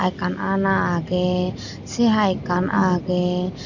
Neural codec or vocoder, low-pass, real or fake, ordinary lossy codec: none; 7.2 kHz; real; none